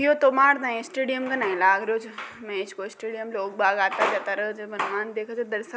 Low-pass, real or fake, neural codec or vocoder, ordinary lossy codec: none; real; none; none